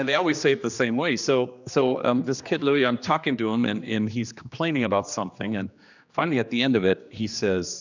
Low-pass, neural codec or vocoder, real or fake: 7.2 kHz; codec, 16 kHz, 2 kbps, X-Codec, HuBERT features, trained on general audio; fake